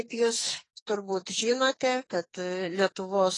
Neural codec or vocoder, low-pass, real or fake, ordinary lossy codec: codec, 44.1 kHz, 2.6 kbps, SNAC; 10.8 kHz; fake; AAC, 32 kbps